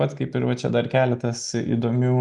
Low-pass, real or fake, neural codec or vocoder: 10.8 kHz; real; none